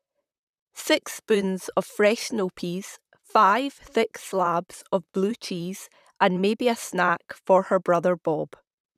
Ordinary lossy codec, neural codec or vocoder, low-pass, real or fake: none; vocoder, 44.1 kHz, 128 mel bands, Pupu-Vocoder; 14.4 kHz; fake